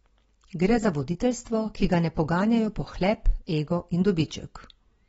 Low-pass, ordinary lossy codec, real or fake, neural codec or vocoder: 19.8 kHz; AAC, 24 kbps; real; none